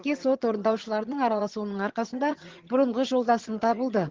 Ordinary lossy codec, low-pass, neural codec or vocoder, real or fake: Opus, 16 kbps; 7.2 kHz; vocoder, 22.05 kHz, 80 mel bands, HiFi-GAN; fake